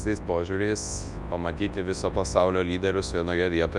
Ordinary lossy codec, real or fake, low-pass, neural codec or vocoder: Opus, 64 kbps; fake; 10.8 kHz; codec, 24 kHz, 0.9 kbps, WavTokenizer, large speech release